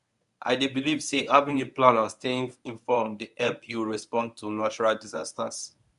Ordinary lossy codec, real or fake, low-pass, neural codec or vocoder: none; fake; 10.8 kHz; codec, 24 kHz, 0.9 kbps, WavTokenizer, medium speech release version 1